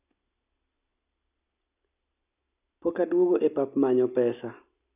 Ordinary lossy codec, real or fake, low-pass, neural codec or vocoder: none; real; 3.6 kHz; none